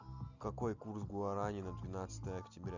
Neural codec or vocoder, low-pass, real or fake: none; 7.2 kHz; real